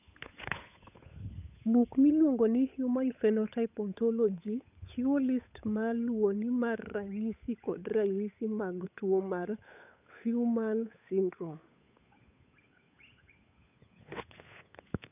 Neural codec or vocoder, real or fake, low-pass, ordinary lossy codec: codec, 16 kHz, 8 kbps, FunCodec, trained on Chinese and English, 25 frames a second; fake; 3.6 kHz; none